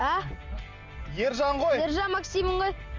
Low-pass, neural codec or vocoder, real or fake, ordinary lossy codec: 7.2 kHz; none; real; Opus, 32 kbps